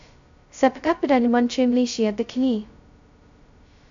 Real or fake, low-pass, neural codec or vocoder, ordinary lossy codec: fake; 7.2 kHz; codec, 16 kHz, 0.2 kbps, FocalCodec; AAC, 64 kbps